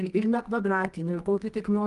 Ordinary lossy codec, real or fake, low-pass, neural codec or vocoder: Opus, 32 kbps; fake; 10.8 kHz; codec, 24 kHz, 0.9 kbps, WavTokenizer, medium music audio release